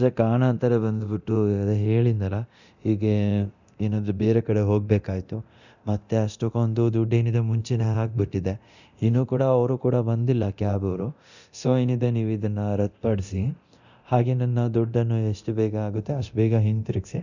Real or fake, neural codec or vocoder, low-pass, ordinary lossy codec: fake; codec, 24 kHz, 0.9 kbps, DualCodec; 7.2 kHz; none